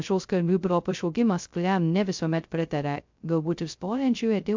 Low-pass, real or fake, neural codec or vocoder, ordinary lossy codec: 7.2 kHz; fake; codec, 16 kHz, 0.2 kbps, FocalCodec; MP3, 64 kbps